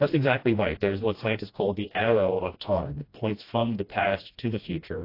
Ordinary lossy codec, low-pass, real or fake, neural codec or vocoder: AAC, 32 kbps; 5.4 kHz; fake; codec, 16 kHz, 1 kbps, FreqCodec, smaller model